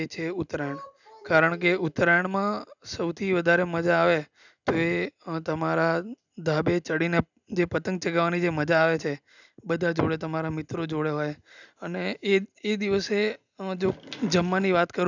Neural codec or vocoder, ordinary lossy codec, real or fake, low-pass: none; none; real; 7.2 kHz